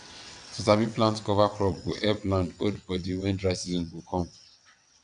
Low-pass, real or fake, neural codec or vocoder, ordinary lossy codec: 9.9 kHz; fake; vocoder, 22.05 kHz, 80 mel bands, WaveNeXt; none